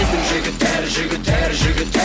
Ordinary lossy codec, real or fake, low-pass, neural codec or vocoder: none; real; none; none